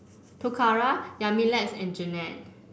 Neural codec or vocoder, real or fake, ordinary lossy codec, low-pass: none; real; none; none